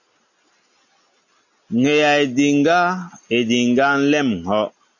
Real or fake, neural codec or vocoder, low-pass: real; none; 7.2 kHz